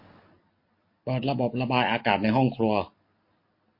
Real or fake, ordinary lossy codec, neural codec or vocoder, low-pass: real; MP3, 32 kbps; none; 5.4 kHz